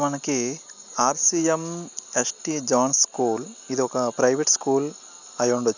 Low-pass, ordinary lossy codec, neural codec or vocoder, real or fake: 7.2 kHz; none; none; real